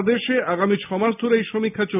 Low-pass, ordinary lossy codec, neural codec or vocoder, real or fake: 3.6 kHz; none; none; real